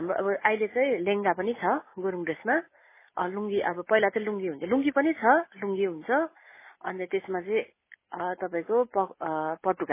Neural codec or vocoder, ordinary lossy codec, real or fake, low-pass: none; MP3, 16 kbps; real; 3.6 kHz